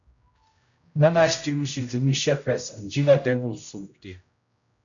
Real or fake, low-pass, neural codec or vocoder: fake; 7.2 kHz; codec, 16 kHz, 0.5 kbps, X-Codec, HuBERT features, trained on general audio